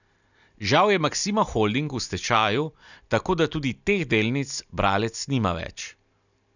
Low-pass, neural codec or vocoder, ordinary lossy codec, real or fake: 7.2 kHz; none; none; real